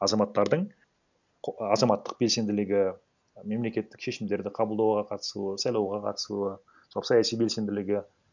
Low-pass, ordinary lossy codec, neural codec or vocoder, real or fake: 7.2 kHz; none; none; real